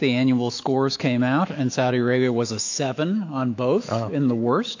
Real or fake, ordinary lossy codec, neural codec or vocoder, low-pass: fake; AAC, 48 kbps; autoencoder, 48 kHz, 128 numbers a frame, DAC-VAE, trained on Japanese speech; 7.2 kHz